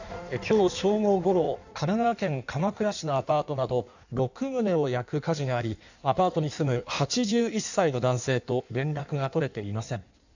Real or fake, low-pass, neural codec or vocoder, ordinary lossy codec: fake; 7.2 kHz; codec, 16 kHz in and 24 kHz out, 1.1 kbps, FireRedTTS-2 codec; none